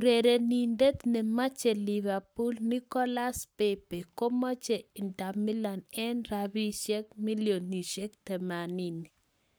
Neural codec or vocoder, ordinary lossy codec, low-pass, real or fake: codec, 44.1 kHz, 7.8 kbps, Pupu-Codec; none; none; fake